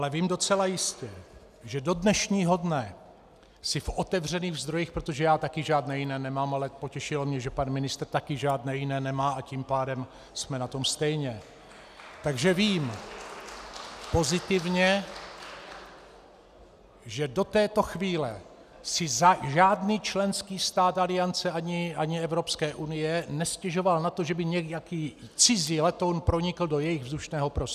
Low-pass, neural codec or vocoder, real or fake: 14.4 kHz; none; real